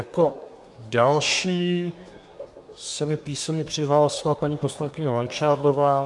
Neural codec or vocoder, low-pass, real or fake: codec, 24 kHz, 1 kbps, SNAC; 10.8 kHz; fake